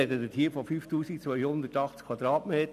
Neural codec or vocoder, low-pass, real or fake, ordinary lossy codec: none; 14.4 kHz; real; none